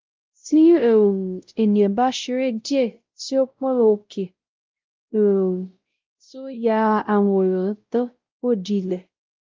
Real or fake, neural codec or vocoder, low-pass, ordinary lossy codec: fake; codec, 16 kHz, 0.5 kbps, X-Codec, WavLM features, trained on Multilingual LibriSpeech; 7.2 kHz; Opus, 32 kbps